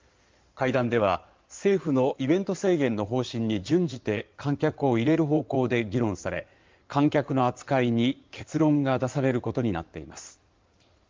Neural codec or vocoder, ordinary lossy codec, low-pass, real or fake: codec, 16 kHz in and 24 kHz out, 2.2 kbps, FireRedTTS-2 codec; Opus, 32 kbps; 7.2 kHz; fake